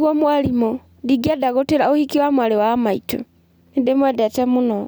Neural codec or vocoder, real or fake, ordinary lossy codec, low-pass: none; real; none; none